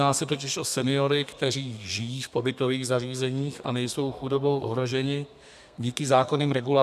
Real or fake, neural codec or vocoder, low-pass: fake; codec, 32 kHz, 1.9 kbps, SNAC; 14.4 kHz